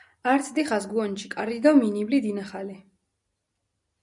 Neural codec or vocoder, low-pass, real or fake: none; 10.8 kHz; real